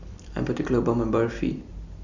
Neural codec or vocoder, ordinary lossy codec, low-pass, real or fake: vocoder, 44.1 kHz, 128 mel bands every 256 samples, BigVGAN v2; none; 7.2 kHz; fake